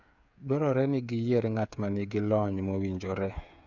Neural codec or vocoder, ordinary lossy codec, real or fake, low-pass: codec, 16 kHz, 8 kbps, FreqCodec, smaller model; none; fake; 7.2 kHz